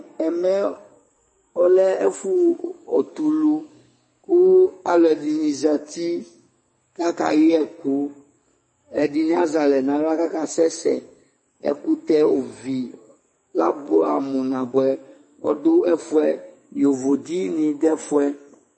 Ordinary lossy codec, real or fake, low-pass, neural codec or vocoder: MP3, 32 kbps; fake; 9.9 kHz; codec, 44.1 kHz, 2.6 kbps, SNAC